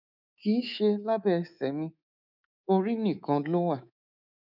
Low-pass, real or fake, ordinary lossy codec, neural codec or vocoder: 5.4 kHz; fake; none; codec, 24 kHz, 3.1 kbps, DualCodec